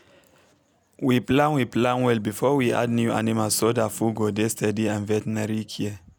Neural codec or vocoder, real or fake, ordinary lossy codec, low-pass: none; real; none; none